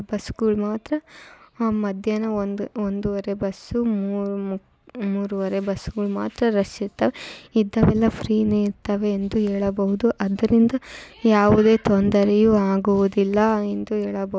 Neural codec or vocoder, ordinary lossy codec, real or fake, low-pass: none; none; real; none